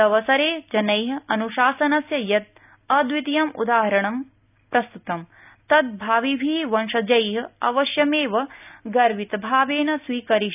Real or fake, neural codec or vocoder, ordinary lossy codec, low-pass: real; none; none; 3.6 kHz